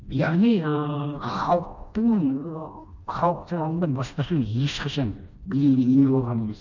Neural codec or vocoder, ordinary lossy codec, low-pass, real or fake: codec, 16 kHz, 1 kbps, FreqCodec, smaller model; none; 7.2 kHz; fake